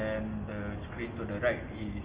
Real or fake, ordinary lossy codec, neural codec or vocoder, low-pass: real; Opus, 24 kbps; none; 3.6 kHz